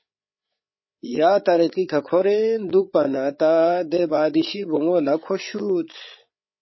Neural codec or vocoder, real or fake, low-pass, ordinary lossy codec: codec, 16 kHz, 8 kbps, FreqCodec, larger model; fake; 7.2 kHz; MP3, 24 kbps